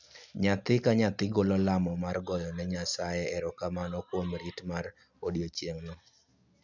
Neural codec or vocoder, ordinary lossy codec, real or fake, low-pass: none; none; real; 7.2 kHz